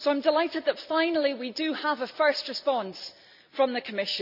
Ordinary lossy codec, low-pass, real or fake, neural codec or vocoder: none; 5.4 kHz; real; none